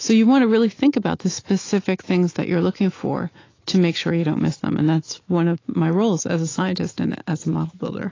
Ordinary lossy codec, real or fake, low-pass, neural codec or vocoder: AAC, 32 kbps; fake; 7.2 kHz; autoencoder, 48 kHz, 128 numbers a frame, DAC-VAE, trained on Japanese speech